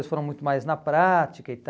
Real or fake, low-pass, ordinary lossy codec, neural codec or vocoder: real; none; none; none